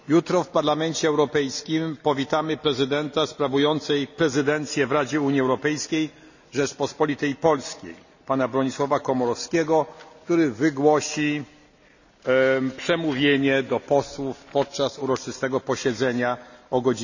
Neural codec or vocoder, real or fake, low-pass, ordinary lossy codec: none; real; 7.2 kHz; none